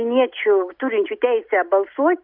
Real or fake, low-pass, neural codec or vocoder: real; 5.4 kHz; none